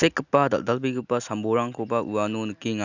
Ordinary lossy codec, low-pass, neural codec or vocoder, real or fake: none; 7.2 kHz; none; real